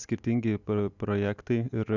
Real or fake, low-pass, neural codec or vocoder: real; 7.2 kHz; none